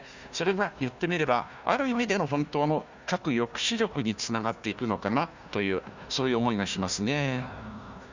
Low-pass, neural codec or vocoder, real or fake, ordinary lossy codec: 7.2 kHz; codec, 16 kHz, 1 kbps, FunCodec, trained on Chinese and English, 50 frames a second; fake; Opus, 64 kbps